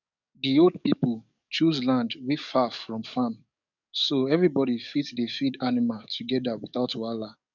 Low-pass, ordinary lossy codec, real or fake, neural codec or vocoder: 7.2 kHz; none; fake; codec, 44.1 kHz, 7.8 kbps, DAC